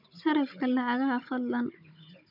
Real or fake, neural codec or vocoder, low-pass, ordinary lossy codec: fake; codec, 16 kHz, 16 kbps, FunCodec, trained on Chinese and English, 50 frames a second; 5.4 kHz; none